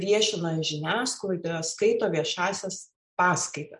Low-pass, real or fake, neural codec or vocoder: 10.8 kHz; real; none